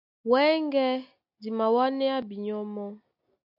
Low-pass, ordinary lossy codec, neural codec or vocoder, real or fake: 5.4 kHz; AAC, 48 kbps; none; real